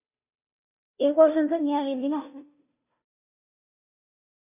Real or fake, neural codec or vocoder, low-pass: fake; codec, 16 kHz, 0.5 kbps, FunCodec, trained on Chinese and English, 25 frames a second; 3.6 kHz